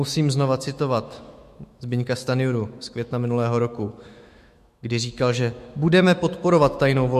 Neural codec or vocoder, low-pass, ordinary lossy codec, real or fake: autoencoder, 48 kHz, 128 numbers a frame, DAC-VAE, trained on Japanese speech; 14.4 kHz; MP3, 64 kbps; fake